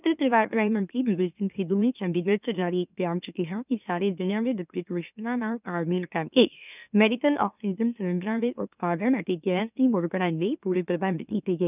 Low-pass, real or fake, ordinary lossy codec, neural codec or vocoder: 3.6 kHz; fake; none; autoencoder, 44.1 kHz, a latent of 192 numbers a frame, MeloTTS